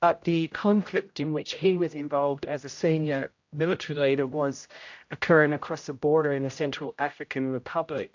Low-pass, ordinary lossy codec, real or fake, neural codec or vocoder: 7.2 kHz; AAC, 48 kbps; fake; codec, 16 kHz, 0.5 kbps, X-Codec, HuBERT features, trained on general audio